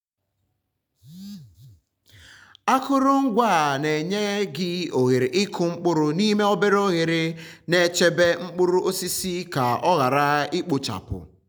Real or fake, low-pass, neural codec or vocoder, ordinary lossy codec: real; none; none; none